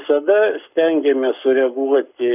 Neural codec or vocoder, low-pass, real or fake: codec, 44.1 kHz, 7.8 kbps, Pupu-Codec; 3.6 kHz; fake